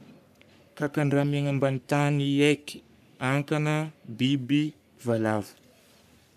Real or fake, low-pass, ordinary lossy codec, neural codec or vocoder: fake; 14.4 kHz; none; codec, 44.1 kHz, 3.4 kbps, Pupu-Codec